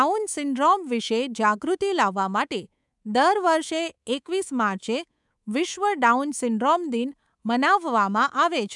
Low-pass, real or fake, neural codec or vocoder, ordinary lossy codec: 10.8 kHz; fake; codec, 24 kHz, 3.1 kbps, DualCodec; none